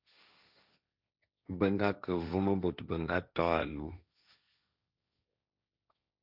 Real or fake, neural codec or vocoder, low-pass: fake; codec, 16 kHz, 1.1 kbps, Voila-Tokenizer; 5.4 kHz